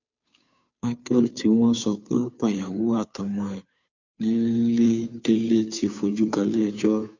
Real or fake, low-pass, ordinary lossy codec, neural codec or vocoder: fake; 7.2 kHz; none; codec, 16 kHz, 2 kbps, FunCodec, trained on Chinese and English, 25 frames a second